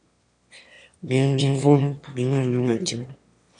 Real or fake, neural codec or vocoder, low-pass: fake; autoencoder, 22.05 kHz, a latent of 192 numbers a frame, VITS, trained on one speaker; 9.9 kHz